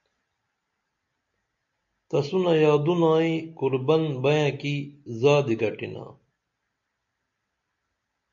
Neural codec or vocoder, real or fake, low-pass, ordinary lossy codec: none; real; 7.2 kHz; MP3, 48 kbps